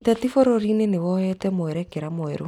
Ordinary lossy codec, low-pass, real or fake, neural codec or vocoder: none; 19.8 kHz; real; none